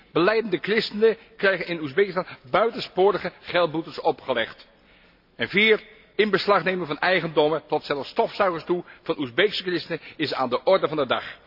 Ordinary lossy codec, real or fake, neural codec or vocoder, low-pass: AAC, 48 kbps; real; none; 5.4 kHz